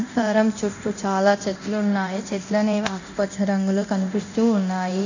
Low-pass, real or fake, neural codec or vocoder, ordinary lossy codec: 7.2 kHz; fake; codec, 24 kHz, 0.9 kbps, DualCodec; none